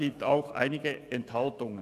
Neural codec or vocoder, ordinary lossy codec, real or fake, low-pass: codec, 44.1 kHz, 7.8 kbps, DAC; none; fake; 14.4 kHz